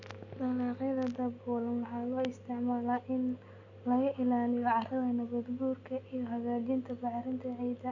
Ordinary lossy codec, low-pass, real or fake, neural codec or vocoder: none; 7.2 kHz; real; none